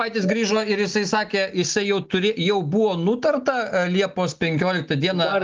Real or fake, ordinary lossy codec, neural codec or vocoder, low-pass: real; Opus, 32 kbps; none; 7.2 kHz